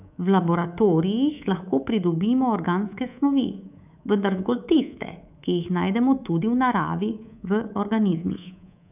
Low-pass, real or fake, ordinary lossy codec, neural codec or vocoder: 3.6 kHz; fake; none; codec, 24 kHz, 3.1 kbps, DualCodec